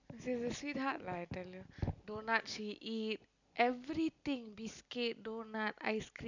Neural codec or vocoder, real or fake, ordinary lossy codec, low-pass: none; real; none; 7.2 kHz